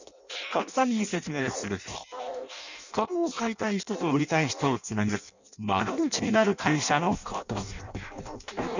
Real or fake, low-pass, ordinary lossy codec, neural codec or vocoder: fake; 7.2 kHz; none; codec, 16 kHz in and 24 kHz out, 0.6 kbps, FireRedTTS-2 codec